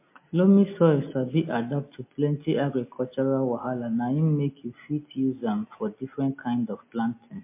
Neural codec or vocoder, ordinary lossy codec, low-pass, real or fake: none; MP3, 24 kbps; 3.6 kHz; real